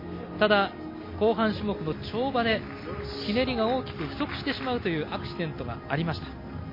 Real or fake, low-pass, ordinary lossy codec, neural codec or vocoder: real; 5.4 kHz; MP3, 24 kbps; none